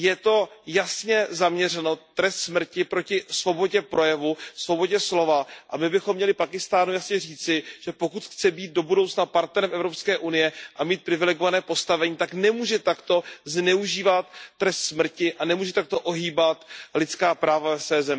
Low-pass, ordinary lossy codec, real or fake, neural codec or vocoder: none; none; real; none